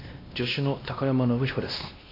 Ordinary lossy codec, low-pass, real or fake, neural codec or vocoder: AAC, 24 kbps; 5.4 kHz; fake; codec, 16 kHz, 1 kbps, X-Codec, WavLM features, trained on Multilingual LibriSpeech